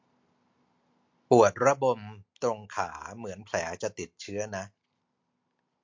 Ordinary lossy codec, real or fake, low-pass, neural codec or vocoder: MP3, 48 kbps; real; 7.2 kHz; none